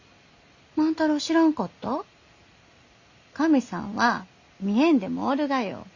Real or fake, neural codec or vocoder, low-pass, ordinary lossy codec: real; none; 7.2 kHz; Opus, 64 kbps